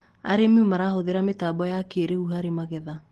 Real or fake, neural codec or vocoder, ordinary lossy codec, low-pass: real; none; Opus, 16 kbps; 14.4 kHz